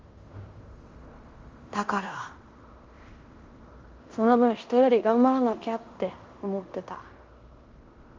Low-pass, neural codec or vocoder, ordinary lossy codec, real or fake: 7.2 kHz; codec, 16 kHz in and 24 kHz out, 0.9 kbps, LongCat-Audio-Codec, fine tuned four codebook decoder; Opus, 32 kbps; fake